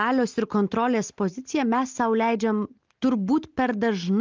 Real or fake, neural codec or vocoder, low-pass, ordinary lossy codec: real; none; 7.2 kHz; Opus, 24 kbps